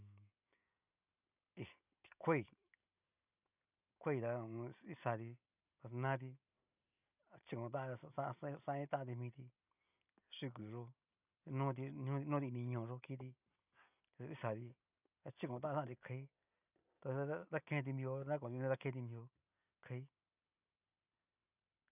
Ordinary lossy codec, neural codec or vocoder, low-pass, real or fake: none; none; 3.6 kHz; real